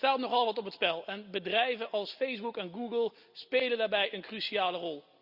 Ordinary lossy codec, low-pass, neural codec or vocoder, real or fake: Opus, 64 kbps; 5.4 kHz; none; real